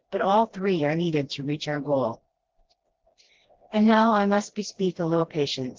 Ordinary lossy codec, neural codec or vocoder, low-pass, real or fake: Opus, 16 kbps; codec, 16 kHz, 1 kbps, FreqCodec, smaller model; 7.2 kHz; fake